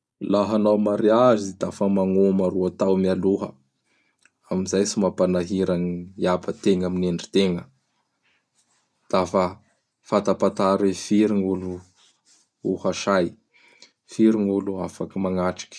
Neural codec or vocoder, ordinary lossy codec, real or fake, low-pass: none; none; real; none